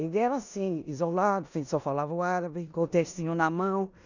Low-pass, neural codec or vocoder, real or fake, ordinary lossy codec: 7.2 kHz; codec, 16 kHz in and 24 kHz out, 0.9 kbps, LongCat-Audio-Codec, four codebook decoder; fake; none